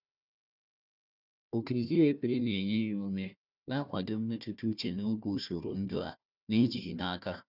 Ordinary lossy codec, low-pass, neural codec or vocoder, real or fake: none; 5.4 kHz; codec, 16 kHz, 1 kbps, FunCodec, trained on Chinese and English, 50 frames a second; fake